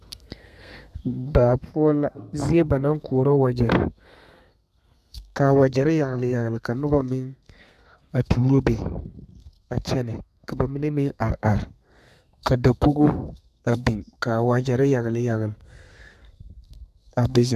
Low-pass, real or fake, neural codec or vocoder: 14.4 kHz; fake; codec, 32 kHz, 1.9 kbps, SNAC